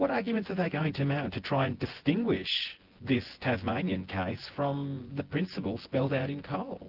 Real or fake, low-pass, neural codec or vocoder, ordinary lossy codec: fake; 5.4 kHz; vocoder, 24 kHz, 100 mel bands, Vocos; Opus, 16 kbps